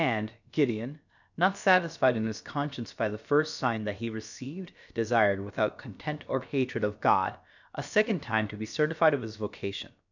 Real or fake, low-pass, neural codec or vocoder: fake; 7.2 kHz; codec, 16 kHz, about 1 kbps, DyCAST, with the encoder's durations